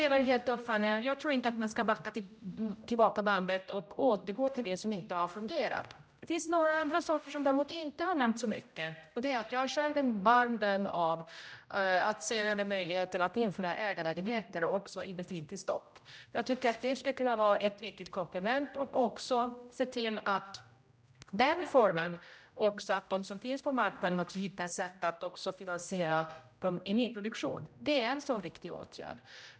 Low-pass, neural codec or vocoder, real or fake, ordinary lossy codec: none; codec, 16 kHz, 0.5 kbps, X-Codec, HuBERT features, trained on general audio; fake; none